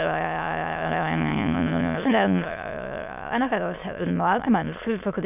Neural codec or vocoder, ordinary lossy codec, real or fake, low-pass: autoencoder, 22.05 kHz, a latent of 192 numbers a frame, VITS, trained on many speakers; none; fake; 3.6 kHz